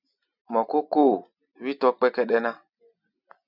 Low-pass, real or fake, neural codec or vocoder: 5.4 kHz; real; none